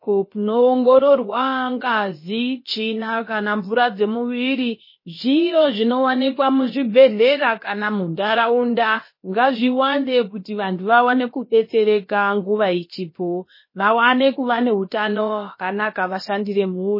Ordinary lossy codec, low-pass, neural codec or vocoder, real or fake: MP3, 24 kbps; 5.4 kHz; codec, 16 kHz, about 1 kbps, DyCAST, with the encoder's durations; fake